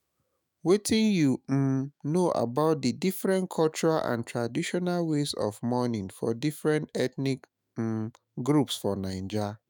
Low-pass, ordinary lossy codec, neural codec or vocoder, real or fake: none; none; autoencoder, 48 kHz, 128 numbers a frame, DAC-VAE, trained on Japanese speech; fake